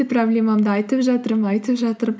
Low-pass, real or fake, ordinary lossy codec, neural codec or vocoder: none; real; none; none